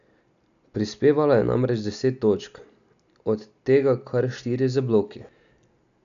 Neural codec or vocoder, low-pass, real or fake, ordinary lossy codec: none; 7.2 kHz; real; none